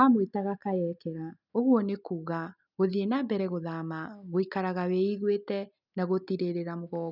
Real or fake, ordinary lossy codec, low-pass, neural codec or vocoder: real; none; 5.4 kHz; none